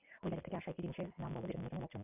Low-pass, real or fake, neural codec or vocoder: 3.6 kHz; real; none